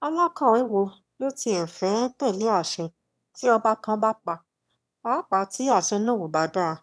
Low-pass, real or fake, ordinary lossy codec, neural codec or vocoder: none; fake; none; autoencoder, 22.05 kHz, a latent of 192 numbers a frame, VITS, trained on one speaker